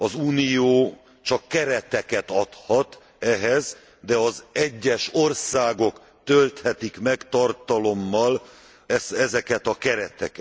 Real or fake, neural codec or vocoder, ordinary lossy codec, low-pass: real; none; none; none